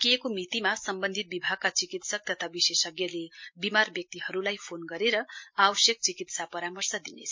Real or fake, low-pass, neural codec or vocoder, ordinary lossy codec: real; 7.2 kHz; none; MP3, 48 kbps